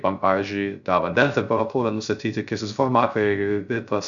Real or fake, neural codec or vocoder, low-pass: fake; codec, 16 kHz, 0.3 kbps, FocalCodec; 7.2 kHz